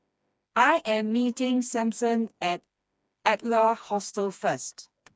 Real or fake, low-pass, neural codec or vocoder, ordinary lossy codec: fake; none; codec, 16 kHz, 2 kbps, FreqCodec, smaller model; none